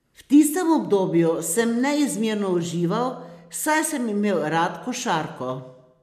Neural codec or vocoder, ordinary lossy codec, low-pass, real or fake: none; MP3, 96 kbps; 14.4 kHz; real